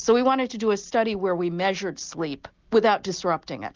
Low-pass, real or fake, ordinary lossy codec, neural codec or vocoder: 7.2 kHz; real; Opus, 32 kbps; none